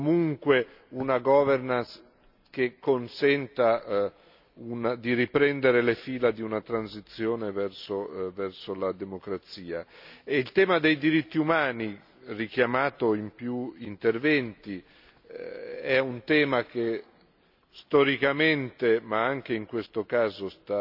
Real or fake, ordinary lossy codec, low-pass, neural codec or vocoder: real; none; 5.4 kHz; none